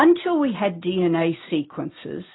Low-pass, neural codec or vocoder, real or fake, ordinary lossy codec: 7.2 kHz; none; real; AAC, 16 kbps